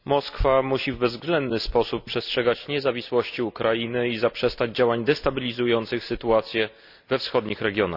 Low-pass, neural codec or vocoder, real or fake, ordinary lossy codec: 5.4 kHz; none; real; none